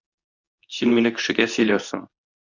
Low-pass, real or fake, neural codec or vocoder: 7.2 kHz; fake; codec, 24 kHz, 0.9 kbps, WavTokenizer, medium speech release version 2